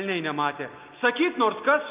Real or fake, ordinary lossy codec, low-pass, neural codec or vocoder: real; Opus, 24 kbps; 3.6 kHz; none